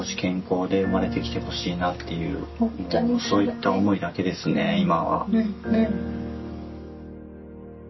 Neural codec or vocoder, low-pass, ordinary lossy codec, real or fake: none; 7.2 kHz; MP3, 24 kbps; real